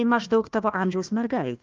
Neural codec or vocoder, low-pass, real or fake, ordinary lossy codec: codec, 16 kHz, 1 kbps, FunCodec, trained on Chinese and English, 50 frames a second; 7.2 kHz; fake; Opus, 32 kbps